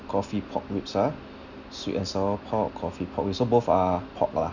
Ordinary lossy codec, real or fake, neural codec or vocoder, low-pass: none; real; none; 7.2 kHz